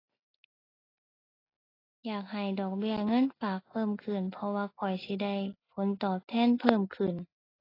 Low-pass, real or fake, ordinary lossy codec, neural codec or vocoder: 5.4 kHz; real; AAC, 24 kbps; none